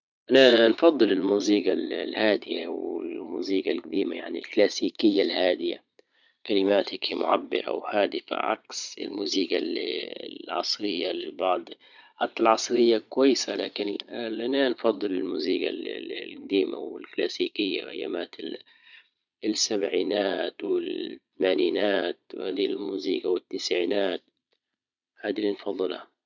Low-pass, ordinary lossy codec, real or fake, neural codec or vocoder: 7.2 kHz; none; fake; vocoder, 22.05 kHz, 80 mel bands, Vocos